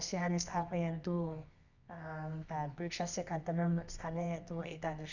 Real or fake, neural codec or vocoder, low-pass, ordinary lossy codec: fake; codec, 24 kHz, 0.9 kbps, WavTokenizer, medium music audio release; 7.2 kHz; none